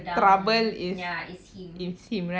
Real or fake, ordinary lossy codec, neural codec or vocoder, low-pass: real; none; none; none